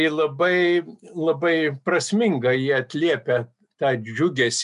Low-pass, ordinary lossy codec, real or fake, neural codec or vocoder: 10.8 kHz; MP3, 96 kbps; real; none